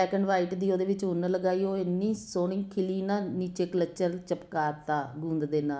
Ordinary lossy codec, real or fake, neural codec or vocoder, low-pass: none; real; none; none